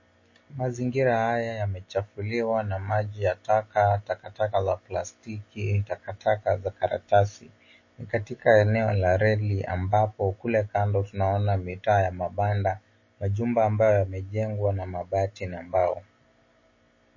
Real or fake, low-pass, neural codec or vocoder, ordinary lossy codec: real; 7.2 kHz; none; MP3, 32 kbps